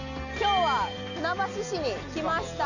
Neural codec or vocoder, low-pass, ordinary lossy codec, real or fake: none; 7.2 kHz; none; real